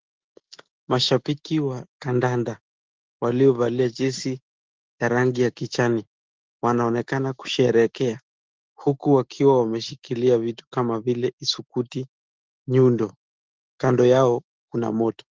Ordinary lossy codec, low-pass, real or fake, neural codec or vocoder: Opus, 16 kbps; 7.2 kHz; fake; autoencoder, 48 kHz, 128 numbers a frame, DAC-VAE, trained on Japanese speech